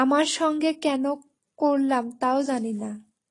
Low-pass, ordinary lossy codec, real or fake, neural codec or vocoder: 10.8 kHz; AAC, 32 kbps; real; none